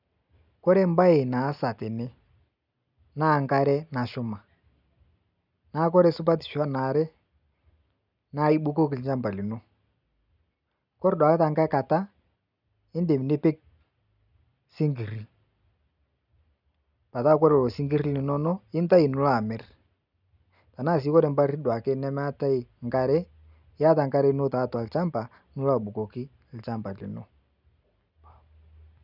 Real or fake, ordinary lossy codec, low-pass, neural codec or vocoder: real; none; 5.4 kHz; none